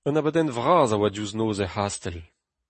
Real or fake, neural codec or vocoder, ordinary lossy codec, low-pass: real; none; MP3, 32 kbps; 10.8 kHz